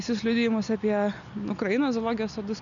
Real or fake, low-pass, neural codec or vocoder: real; 7.2 kHz; none